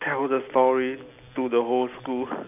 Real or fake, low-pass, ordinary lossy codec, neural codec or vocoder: real; 3.6 kHz; none; none